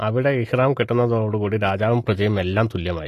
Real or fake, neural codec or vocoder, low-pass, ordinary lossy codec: real; none; 14.4 kHz; AAC, 48 kbps